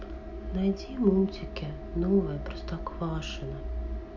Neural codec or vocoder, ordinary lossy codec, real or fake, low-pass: none; MP3, 64 kbps; real; 7.2 kHz